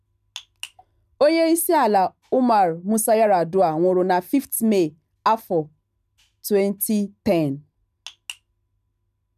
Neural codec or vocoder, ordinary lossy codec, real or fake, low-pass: none; none; real; 14.4 kHz